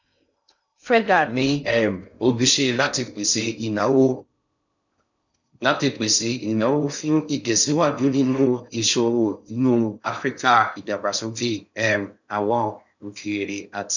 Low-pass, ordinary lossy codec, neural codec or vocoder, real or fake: 7.2 kHz; none; codec, 16 kHz in and 24 kHz out, 0.6 kbps, FocalCodec, streaming, 2048 codes; fake